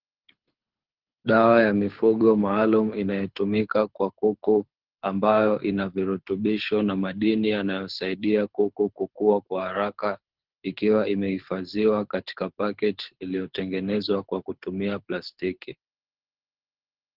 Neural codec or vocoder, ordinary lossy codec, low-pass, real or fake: codec, 24 kHz, 6 kbps, HILCodec; Opus, 16 kbps; 5.4 kHz; fake